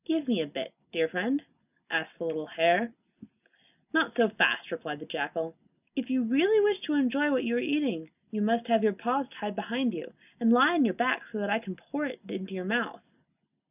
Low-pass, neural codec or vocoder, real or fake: 3.6 kHz; none; real